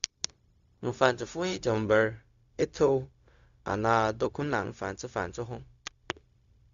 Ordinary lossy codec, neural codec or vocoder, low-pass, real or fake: Opus, 64 kbps; codec, 16 kHz, 0.4 kbps, LongCat-Audio-Codec; 7.2 kHz; fake